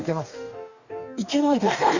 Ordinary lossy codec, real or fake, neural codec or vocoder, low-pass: none; fake; codec, 44.1 kHz, 2.6 kbps, DAC; 7.2 kHz